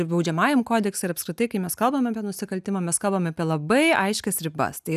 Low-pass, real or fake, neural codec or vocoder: 14.4 kHz; real; none